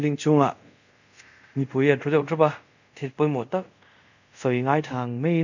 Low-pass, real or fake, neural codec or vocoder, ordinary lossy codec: 7.2 kHz; fake; codec, 24 kHz, 0.5 kbps, DualCodec; none